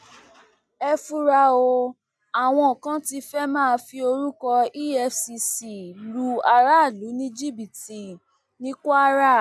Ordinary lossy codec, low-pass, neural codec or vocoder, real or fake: none; none; none; real